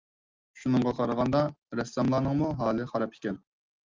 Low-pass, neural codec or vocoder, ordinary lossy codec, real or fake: 7.2 kHz; none; Opus, 32 kbps; real